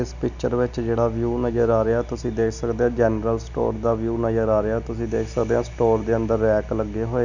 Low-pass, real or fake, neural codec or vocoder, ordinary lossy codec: 7.2 kHz; real; none; none